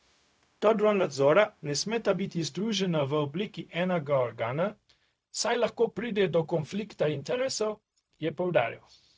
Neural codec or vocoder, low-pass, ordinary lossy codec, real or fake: codec, 16 kHz, 0.4 kbps, LongCat-Audio-Codec; none; none; fake